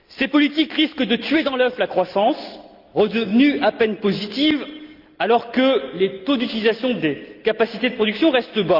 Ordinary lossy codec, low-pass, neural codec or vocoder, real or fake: Opus, 24 kbps; 5.4 kHz; none; real